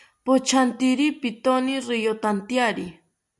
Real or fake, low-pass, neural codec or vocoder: real; 10.8 kHz; none